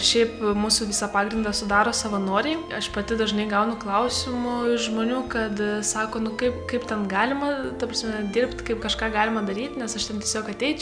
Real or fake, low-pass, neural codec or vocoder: real; 9.9 kHz; none